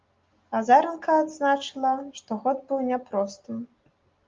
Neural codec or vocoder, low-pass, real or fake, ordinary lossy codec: none; 7.2 kHz; real; Opus, 32 kbps